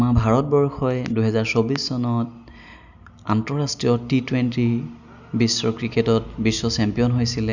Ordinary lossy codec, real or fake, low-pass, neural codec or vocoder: none; real; 7.2 kHz; none